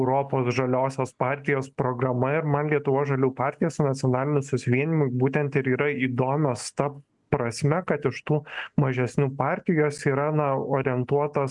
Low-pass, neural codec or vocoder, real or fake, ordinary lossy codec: 10.8 kHz; none; real; MP3, 96 kbps